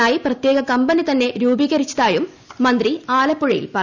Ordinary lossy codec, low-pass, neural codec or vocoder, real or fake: none; 7.2 kHz; none; real